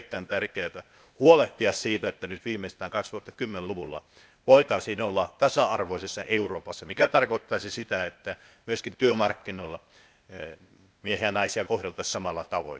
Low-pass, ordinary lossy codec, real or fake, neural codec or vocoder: none; none; fake; codec, 16 kHz, 0.8 kbps, ZipCodec